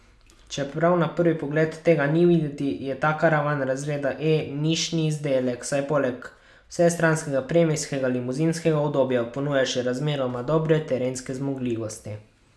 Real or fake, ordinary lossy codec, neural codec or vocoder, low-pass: real; none; none; none